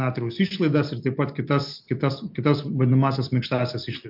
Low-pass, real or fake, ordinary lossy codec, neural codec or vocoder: 5.4 kHz; real; MP3, 48 kbps; none